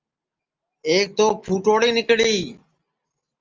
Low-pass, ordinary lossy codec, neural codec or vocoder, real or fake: 7.2 kHz; Opus, 32 kbps; none; real